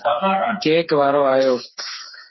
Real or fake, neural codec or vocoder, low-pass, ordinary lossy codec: fake; codec, 16 kHz, 4 kbps, FreqCodec, smaller model; 7.2 kHz; MP3, 24 kbps